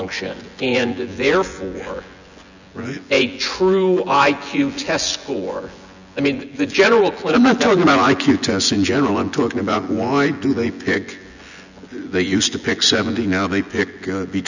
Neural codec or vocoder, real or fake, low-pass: vocoder, 24 kHz, 100 mel bands, Vocos; fake; 7.2 kHz